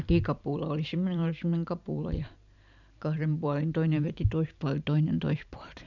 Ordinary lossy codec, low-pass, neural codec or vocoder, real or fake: none; 7.2 kHz; vocoder, 44.1 kHz, 128 mel bands every 512 samples, BigVGAN v2; fake